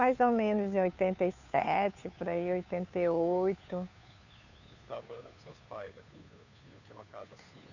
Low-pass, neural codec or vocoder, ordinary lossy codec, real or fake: 7.2 kHz; codec, 16 kHz, 2 kbps, FunCodec, trained on Chinese and English, 25 frames a second; none; fake